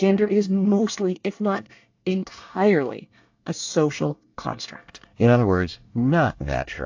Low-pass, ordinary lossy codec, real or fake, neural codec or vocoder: 7.2 kHz; AAC, 48 kbps; fake; codec, 24 kHz, 1 kbps, SNAC